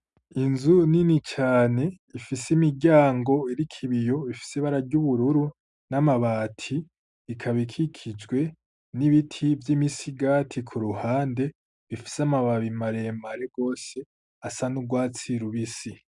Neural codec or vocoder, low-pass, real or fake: none; 10.8 kHz; real